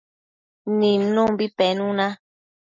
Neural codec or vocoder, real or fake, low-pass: none; real; 7.2 kHz